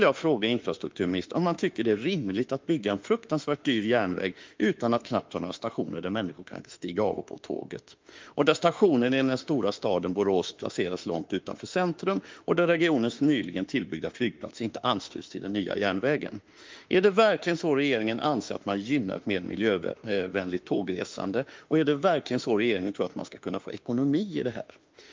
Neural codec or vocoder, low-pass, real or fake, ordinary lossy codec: autoencoder, 48 kHz, 32 numbers a frame, DAC-VAE, trained on Japanese speech; 7.2 kHz; fake; Opus, 24 kbps